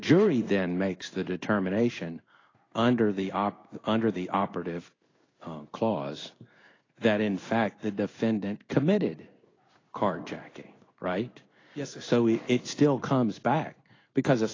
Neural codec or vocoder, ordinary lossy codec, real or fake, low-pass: codec, 16 kHz in and 24 kHz out, 1 kbps, XY-Tokenizer; AAC, 32 kbps; fake; 7.2 kHz